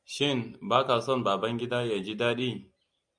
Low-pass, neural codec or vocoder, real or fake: 9.9 kHz; none; real